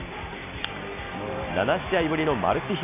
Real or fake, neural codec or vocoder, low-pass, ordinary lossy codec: real; none; 3.6 kHz; none